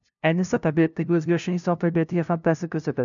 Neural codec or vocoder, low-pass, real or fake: codec, 16 kHz, 0.5 kbps, FunCodec, trained on LibriTTS, 25 frames a second; 7.2 kHz; fake